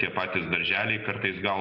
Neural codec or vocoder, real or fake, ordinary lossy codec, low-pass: none; real; Opus, 64 kbps; 5.4 kHz